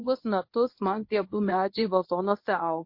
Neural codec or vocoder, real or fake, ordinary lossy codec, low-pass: codec, 24 kHz, 0.9 kbps, WavTokenizer, medium speech release version 1; fake; MP3, 32 kbps; 5.4 kHz